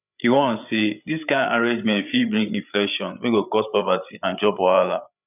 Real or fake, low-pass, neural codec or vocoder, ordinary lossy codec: fake; 3.6 kHz; codec, 16 kHz, 8 kbps, FreqCodec, larger model; none